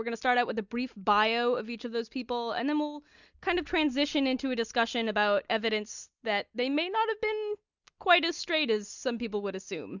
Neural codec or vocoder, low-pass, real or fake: none; 7.2 kHz; real